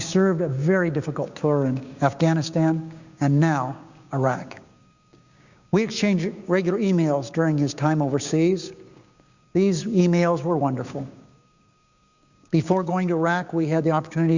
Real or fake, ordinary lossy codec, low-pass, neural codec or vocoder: fake; Opus, 64 kbps; 7.2 kHz; codec, 16 kHz, 6 kbps, DAC